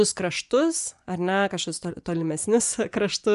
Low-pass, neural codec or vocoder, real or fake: 10.8 kHz; vocoder, 24 kHz, 100 mel bands, Vocos; fake